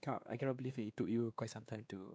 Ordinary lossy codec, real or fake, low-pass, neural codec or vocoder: none; fake; none; codec, 16 kHz, 2 kbps, X-Codec, WavLM features, trained on Multilingual LibriSpeech